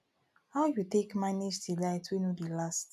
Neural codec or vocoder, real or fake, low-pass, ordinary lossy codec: none; real; 14.4 kHz; none